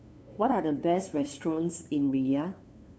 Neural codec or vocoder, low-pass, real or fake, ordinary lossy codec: codec, 16 kHz, 2 kbps, FunCodec, trained on LibriTTS, 25 frames a second; none; fake; none